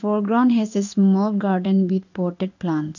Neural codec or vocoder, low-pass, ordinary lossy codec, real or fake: codec, 16 kHz in and 24 kHz out, 1 kbps, XY-Tokenizer; 7.2 kHz; none; fake